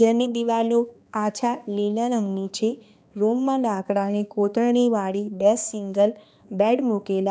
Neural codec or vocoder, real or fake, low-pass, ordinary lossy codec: codec, 16 kHz, 2 kbps, X-Codec, HuBERT features, trained on balanced general audio; fake; none; none